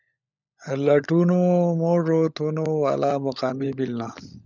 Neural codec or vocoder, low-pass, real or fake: codec, 16 kHz, 16 kbps, FunCodec, trained on LibriTTS, 50 frames a second; 7.2 kHz; fake